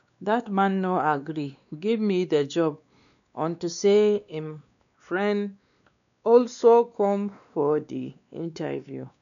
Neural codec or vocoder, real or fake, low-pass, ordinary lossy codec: codec, 16 kHz, 2 kbps, X-Codec, WavLM features, trained on Multilingual LibriSpeech; fake; 7.2 kHz; none